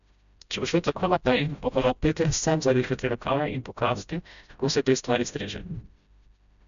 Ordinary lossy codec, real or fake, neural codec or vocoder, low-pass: none; fake; codec, 16 kHz, 0.5 kbps, FreqCodec, smaller model; 7.2 kHz